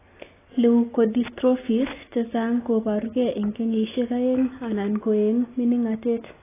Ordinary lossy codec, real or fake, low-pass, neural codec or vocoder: AAC, 16 kbps; real; 3.6 kHz; none